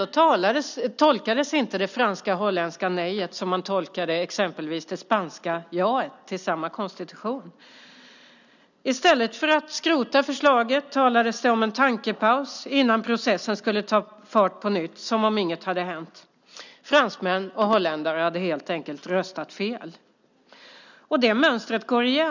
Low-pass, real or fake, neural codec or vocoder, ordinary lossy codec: 7.2 kHz; real; none; none